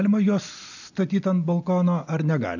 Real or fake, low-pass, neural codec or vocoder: real; 7.2 kHz; none